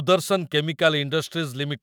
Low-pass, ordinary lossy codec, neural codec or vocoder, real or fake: none; none; autoencoder, 48 kHz, 128 numbers a frame, DAC-VAE, trained on Japanese speech; fake